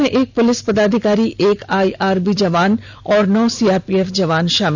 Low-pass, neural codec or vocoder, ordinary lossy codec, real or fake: none; none; none; real